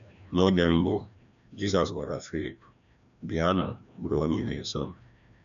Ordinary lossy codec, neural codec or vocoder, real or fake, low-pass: none; codec, 16 kHz, 1 kbps, FreqCodec, larger model; fake; 7.2 kHz